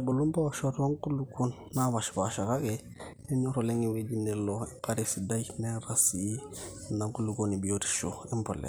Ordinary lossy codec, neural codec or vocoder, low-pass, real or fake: none; none; none; real